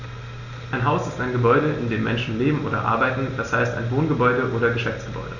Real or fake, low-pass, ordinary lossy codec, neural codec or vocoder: real; 7.2 kHz; none; none